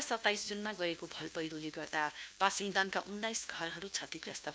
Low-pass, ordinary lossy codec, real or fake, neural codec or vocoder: none; none; fake; codec, 16 kHz, 1 kbps, FunCodec, trained on LibriTTS, 50 frames a second